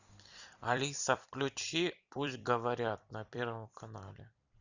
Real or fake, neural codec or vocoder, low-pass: fake; vocoder, 44.1 kHz, 128 mel bands every 256 samples, BigVGAN v2; 7.2 kHz